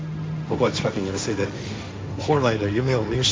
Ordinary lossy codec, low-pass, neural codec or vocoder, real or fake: none; none; codec, 16 kHz, 1.1 kbps, Voila-Tokenizer; fake